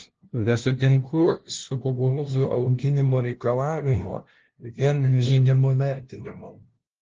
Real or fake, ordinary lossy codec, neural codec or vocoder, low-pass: fake; Opus, 16 kbps; codec, 16 kHz, 0.5 kbps, FunCodec, trained on LibriTTS, 25 frames a second; 7.2 kHz